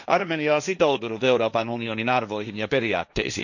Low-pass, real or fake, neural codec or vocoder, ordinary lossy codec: 7.2 kHz; fake; codec, 16 kHz, 1.1 kbps, Voila-Tokenizer; none